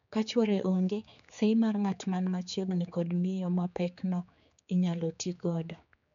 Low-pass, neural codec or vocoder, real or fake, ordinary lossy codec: 7.2 kHz; codec, 16 kHz, 4 kbps, X-Codec, HuBERT features, trained on general audio; fake; none